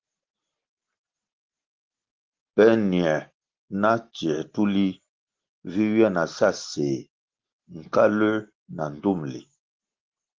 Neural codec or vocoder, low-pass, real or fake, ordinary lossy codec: none; 7.2 kHz; real; Opus, 16 kbps